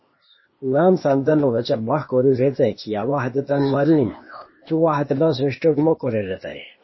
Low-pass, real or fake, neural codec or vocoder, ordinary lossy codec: 7.2 kHz; fake; codec, 16 kHz, 0.8 kbps, ZipCodec; MP3, 24 kbps